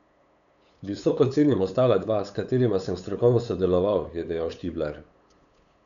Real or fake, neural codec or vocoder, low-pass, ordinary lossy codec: fake; codec, 16 kHz, 8 kbps, FunCodec, trained on LibriTTS, 25 frames a second; 7.2 kHz; none